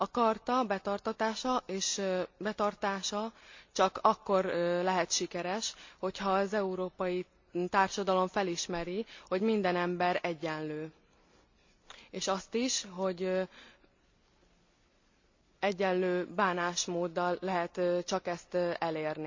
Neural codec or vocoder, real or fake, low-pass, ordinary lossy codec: none; real; 7.2 kHz; MP3, 48 kbps